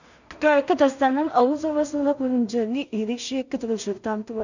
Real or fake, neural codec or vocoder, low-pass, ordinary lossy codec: fake; codec, 16 kHz in and 24 kHz out, 0.4 kbps, LongCat-Audio-Codec, two codebook decoder; 7.2 kHz; none